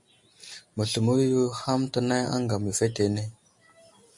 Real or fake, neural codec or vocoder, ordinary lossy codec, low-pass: real; none; MP3, 48 kbps; 10.8 kHz